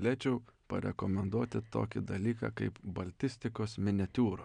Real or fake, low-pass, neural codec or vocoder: real; 9.9 kHz; none